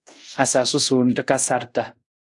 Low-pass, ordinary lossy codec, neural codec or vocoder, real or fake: 10.8 kHz; AAC, 64 kbps; codec, 24 kHz, 0.5 kbps, DualCodec; fake